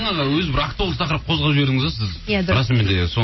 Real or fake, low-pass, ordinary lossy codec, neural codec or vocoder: real; 7.2 kHz; MP3, 24 kbps; none